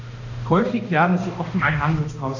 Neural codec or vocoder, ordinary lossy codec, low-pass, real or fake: codec, 16 kHz, 1 kbps, X-Codec, HuBERT features, trained on balanced general audio; none; 7.2 kHz; fake